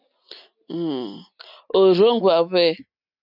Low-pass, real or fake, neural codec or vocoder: 5.4 kHz; real; none